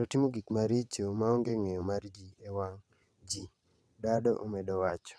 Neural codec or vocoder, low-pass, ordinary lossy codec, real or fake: vocoder, 22.05 kHz, 80 mel bands, WaveNeXt; none; none; fake